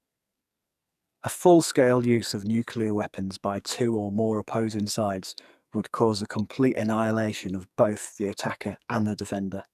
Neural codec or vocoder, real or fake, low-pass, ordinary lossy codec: codec, 44.1 kHz, 2.6 kbps, SNAC; fake; 14.4 kHz; none